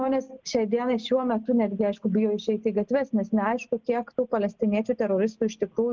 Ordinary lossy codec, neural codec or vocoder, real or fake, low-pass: Opus, 24 kbps; none; real; 7.2 kHz